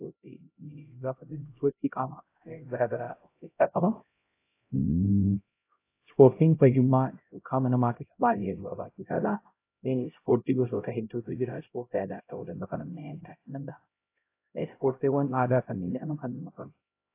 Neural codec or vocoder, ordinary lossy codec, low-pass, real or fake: codec, 16 kHz, 0.5 kbps, X-Codec, HuBERT features, trained on LibriSpeech; AAC, 24 kbps; 3.6 kHz; fake